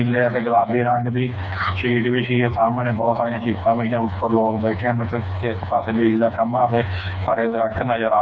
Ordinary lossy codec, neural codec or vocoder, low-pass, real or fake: none; codec, 16 kHz, 2 kbps, FreqCodec, smaller model; none; fake